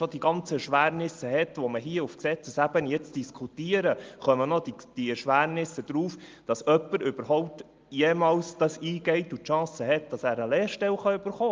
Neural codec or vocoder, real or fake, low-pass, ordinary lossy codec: none; real; 7.2 kHz; Opus, 24 kbps